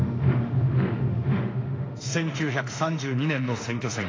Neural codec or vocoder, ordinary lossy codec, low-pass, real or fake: autoencoder, 48 kHz, 32 numbers a frame, DAC-VAE, trained on Japanese speech; AAC, 32 kbps; 7.2 kHz; fake